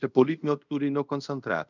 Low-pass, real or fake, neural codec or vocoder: 7.2 kHz; fake; codec, 24 kHz, 0.5 kbps, DualCodec